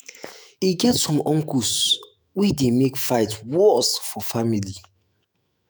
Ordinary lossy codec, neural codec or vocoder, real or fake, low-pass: none; autoencoder, 48 kHz, 128 numbers a frame, DAC-VAE, trained on Japanese speech; fake; none